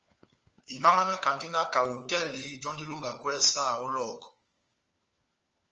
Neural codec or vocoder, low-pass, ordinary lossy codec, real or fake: codec, 16 kHz, 4 kbps, FunCodec, trained on LibriTTS, 50 frames a second; 7.2 kHz; Opus, 24 kbps; fake